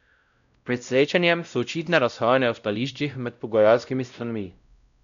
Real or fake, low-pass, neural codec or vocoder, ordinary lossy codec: fake; 7.2 kHz; codec, 16 kHz, 0.5 kbps, X-Codec, WavLM features, trained on Multilingual LibriSpeech; none